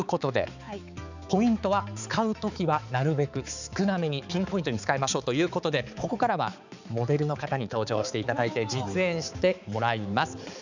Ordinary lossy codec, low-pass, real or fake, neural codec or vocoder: none; 7.2 kHz; fake; codec, 16 kHz, 4 kbps, X-Codec, HuBERT features, trained on balanced general audio